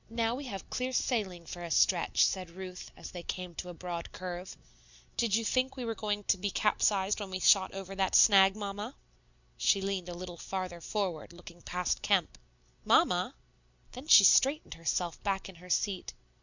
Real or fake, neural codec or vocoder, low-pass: real; none; 7.2 kHz